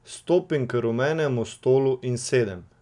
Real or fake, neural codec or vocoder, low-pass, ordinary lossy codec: real; none; 10.8 kHz; none